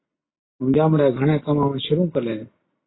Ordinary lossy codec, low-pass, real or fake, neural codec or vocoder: AAC, 16 kbps; 7.2 kHz; real; none